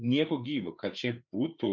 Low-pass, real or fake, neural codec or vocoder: 7.2 kHz; fake; codec, 16 kHz, 4 kbps, FreqCodec, larger model